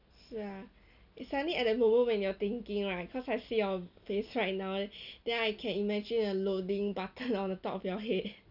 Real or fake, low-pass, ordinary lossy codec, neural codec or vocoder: real; 5.4 kHz; none; none